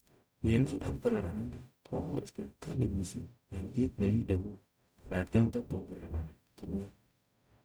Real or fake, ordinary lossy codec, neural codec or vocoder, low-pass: fake; none; codec, 44.1 kHz, 0.9 kbps, DAC; none